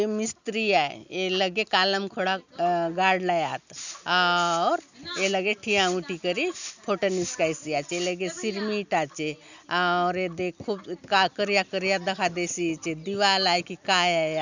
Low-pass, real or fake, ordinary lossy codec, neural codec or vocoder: 7.2 kHz; real; none; none